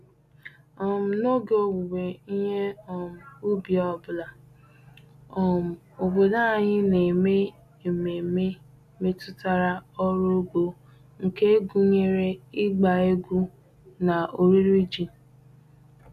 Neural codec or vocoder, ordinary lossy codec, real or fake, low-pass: none; none; real; 14.4 kHz